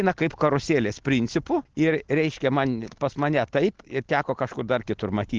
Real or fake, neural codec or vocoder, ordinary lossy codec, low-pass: real; none; Opus, 16 kbps; 7.2 kHz